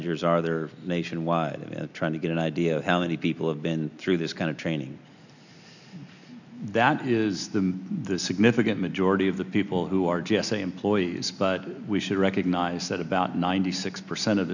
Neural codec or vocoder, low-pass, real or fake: none; 7.2 kHz; real